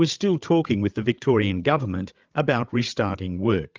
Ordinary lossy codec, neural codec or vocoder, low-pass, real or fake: Opus, 32 kbps; codec, 16 kHz, 8 kbps, FreqCodec, larger model; 7.2 kHz; fake